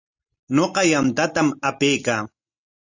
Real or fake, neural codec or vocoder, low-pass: real; none; 7.2 kHz